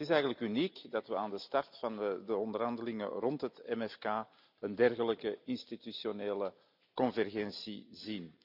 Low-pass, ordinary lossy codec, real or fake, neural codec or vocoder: 5.4 kHz; none; real; none